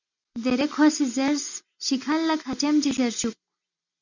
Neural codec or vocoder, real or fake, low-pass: none; real; 7.2 kHz